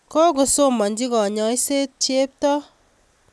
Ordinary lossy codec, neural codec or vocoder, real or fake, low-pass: none; none; real; none